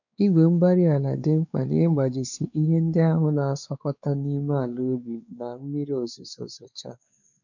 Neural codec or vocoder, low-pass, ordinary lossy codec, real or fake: codec, 16 kHz, 4 kbps, X-Codec, WavLM features, trained on Multilingual LibriSpeech; 7.2 kHz; none; fake